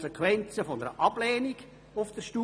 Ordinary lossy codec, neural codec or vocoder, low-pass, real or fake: none; none; none; real